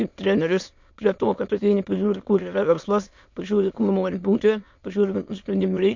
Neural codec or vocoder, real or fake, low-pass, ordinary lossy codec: autoencoder, 22.05 kHz, a latent of 192 numbers a frame, VITS, trained on many speakers; fake; 7.2 kHz; MP3, 48 kbps